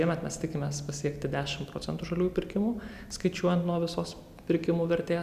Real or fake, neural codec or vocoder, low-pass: real; none; 14.4 kHz